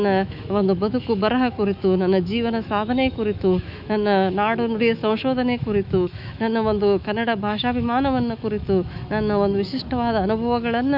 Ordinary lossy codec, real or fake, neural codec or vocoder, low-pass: none; fake; autoencoder, 48 kHz, 128 numbers a frame, DAC-VAE, trained on Japanese speech; 5.4 kHz